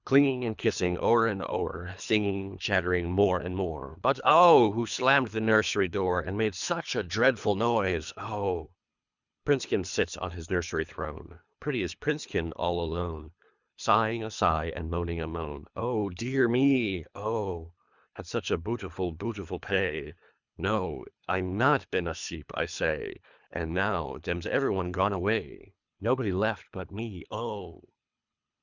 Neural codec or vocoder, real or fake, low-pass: codec, 24 kHz, 3 kbps, HILCodec; fake; 7.2 kHz